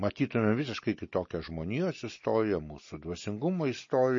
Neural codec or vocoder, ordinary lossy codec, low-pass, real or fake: none; MP3, 32 kbps; 7.2 kHz; real